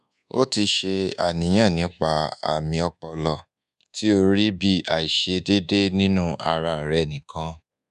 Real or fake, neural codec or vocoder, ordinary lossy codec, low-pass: fake; codec, 24 kHz, 1.2 kbps, DualCodec; none; 10.8 kHz